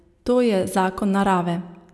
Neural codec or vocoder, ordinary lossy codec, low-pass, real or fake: none; none; none; real